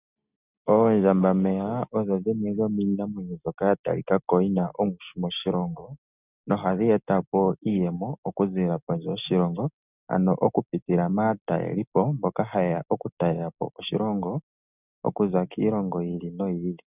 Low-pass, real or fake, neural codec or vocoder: 3.6 kHz; real; none